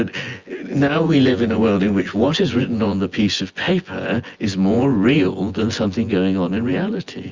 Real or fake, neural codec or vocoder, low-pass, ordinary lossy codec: fake; vocoder, 24 kHz, 100 mel bands, Vocos; 7.2 kHz; Opus, 32 kbps